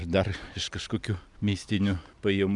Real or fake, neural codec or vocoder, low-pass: real; none; 10.8 kHz